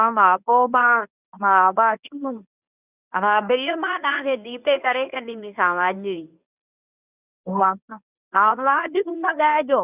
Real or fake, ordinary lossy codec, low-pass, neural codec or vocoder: fake; none; 3.6 kHz; codec, 24 kHz, 0.9 kbps, WavTokenizer, medium speech release version 1